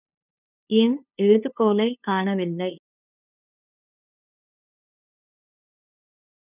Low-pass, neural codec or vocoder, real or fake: 3.6 kHz; codec, 16 kHz, 2 kbps, FunCodec, trained on LibriTTS, 25 frames a second; fake